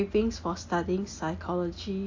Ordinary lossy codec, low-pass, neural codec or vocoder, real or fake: AAC, 48 kbps; 7.2 kHz; none; real